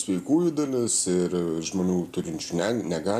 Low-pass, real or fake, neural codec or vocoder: 14.4 kHz; real; none